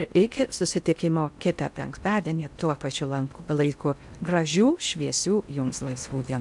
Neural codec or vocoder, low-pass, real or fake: codec, 16 kHz in and 24 kHz out, 0.6 kbps, FocalCodec, streaming, 4096 codes; 10.8 kHz; fake